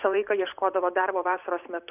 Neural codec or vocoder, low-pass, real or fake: vocoder, 44.1 kHz, 128 mel bands every 512 samples, BigVGAN v2; 3.6 kHz; fake